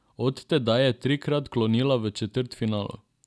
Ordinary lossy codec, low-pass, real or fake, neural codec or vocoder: none; none; real; none